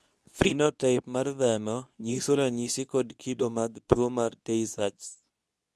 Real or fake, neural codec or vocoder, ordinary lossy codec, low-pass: fake; codec, 24 kHz, 0.9 kbps, WavTokenizer, medium speech release version 2; none; none